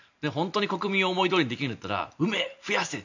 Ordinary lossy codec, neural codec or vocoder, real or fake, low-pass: none; none; real; 7.2 kHz